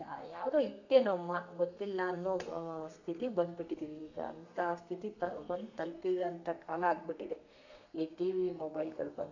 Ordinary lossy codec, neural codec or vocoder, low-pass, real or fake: none; codec, 32 kHz, 1.9 kbps, SNAC; 7.2 kHz; fake